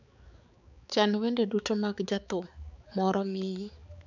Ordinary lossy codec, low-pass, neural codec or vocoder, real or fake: none; 7.2 kHz; codec, 16 kHz, 4 kbps, X-Codec, HuBERT features, trained on balanced general audio; fake